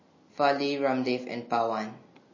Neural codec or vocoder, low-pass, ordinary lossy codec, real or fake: none; 7.2 kHz; MP3, 32 kbps; real